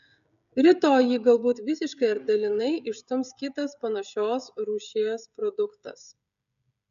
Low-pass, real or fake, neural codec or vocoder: 7.2 kHz; fake; codec, 16 kHz, 16 kbps, FreqCodec, smaller model